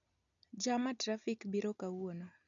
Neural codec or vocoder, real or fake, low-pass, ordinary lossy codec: none; real; 7.2 kHz; none